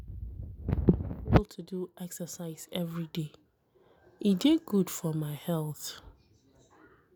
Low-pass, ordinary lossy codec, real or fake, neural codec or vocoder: none; none; real; none